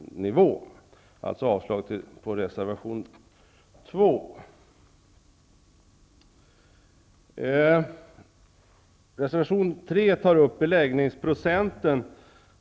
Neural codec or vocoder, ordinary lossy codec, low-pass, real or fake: none; none; none; real